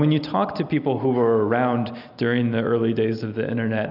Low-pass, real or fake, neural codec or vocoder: 5.4 kHz; real; none